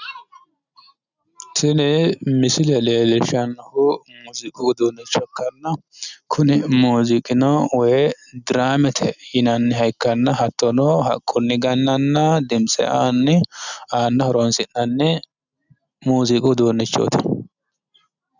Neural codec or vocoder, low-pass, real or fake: none; 7.2 kHz; real